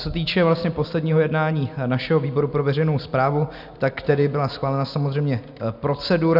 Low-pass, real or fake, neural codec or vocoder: 5.4 kHz; real; none